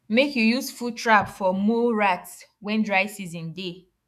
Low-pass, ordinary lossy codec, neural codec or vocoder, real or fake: 14.4 kHz; none; autoencoder, 48 kHz, 128 numbers a frame, DAC-VAE, trained on Japanese speech; fake